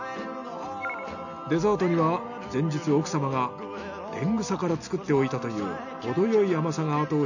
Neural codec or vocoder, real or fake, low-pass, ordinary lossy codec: none; real; 7.2 kHz; none